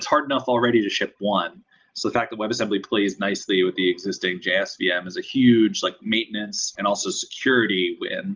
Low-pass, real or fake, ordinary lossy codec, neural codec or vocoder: 7.2 kHz; real; Opus, 32 kbps; none